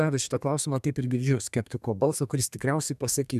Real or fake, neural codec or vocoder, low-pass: fake; codec, 32 kHz, 1.9 kbps, SNAC; 14.4 kHz